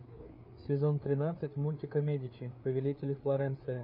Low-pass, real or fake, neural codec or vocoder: 5.4 kHz; fake; codec, 16 kHz, 4 kbps, FreqCodec, larger model